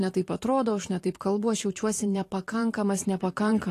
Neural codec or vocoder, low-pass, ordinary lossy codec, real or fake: vocoder, 44.1 kHz, 128 mel bands every 512 samples, BigVGAN v2; 14.4 kHz; AAC, 48 kbps; fake